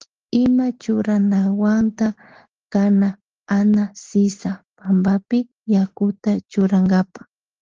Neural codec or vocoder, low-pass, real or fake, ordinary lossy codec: none; 7.2 kHz; real; Opus, 16 kbps